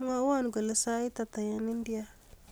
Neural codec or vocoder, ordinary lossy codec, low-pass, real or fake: none; none; none; real